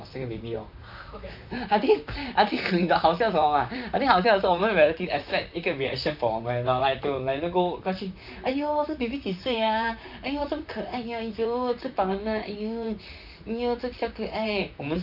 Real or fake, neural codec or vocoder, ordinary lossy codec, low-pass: fake; vocoder, 44.1 kHz, 128 mel bands, Pupu-Vocoder; none; 5.4 kHz